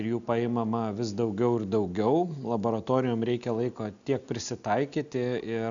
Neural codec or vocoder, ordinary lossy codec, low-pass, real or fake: none; MP3, 96 kbps; 7.2 kHz; real